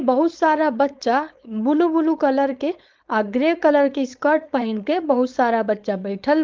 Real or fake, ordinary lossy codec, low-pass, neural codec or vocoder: fake; Opus, 32 kbps; 7.2 kHz; codec, 16 kHz, 4.8 kbps, FACodec